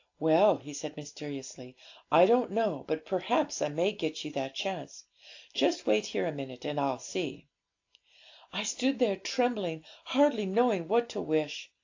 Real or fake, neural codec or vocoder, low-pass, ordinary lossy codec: real; none; 7.2 kHz; AAC, 48 kbps